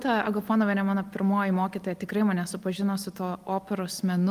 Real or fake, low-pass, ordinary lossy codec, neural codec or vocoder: real; 14.4 kHz; Opus, 32 kbps; none